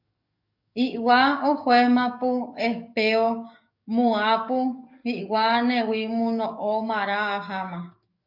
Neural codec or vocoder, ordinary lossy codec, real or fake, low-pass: codec, 44.1 kHz, 7.8 kbps, DAC; MP3, 48 kbps; fake; 5.4 kHz